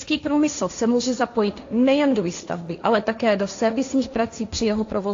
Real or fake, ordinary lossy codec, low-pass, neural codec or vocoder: fake; AAC, 48 kbps; 7.2 kHz; codec, 16 kHz, 1.1 kbps, Voila-Tokenizer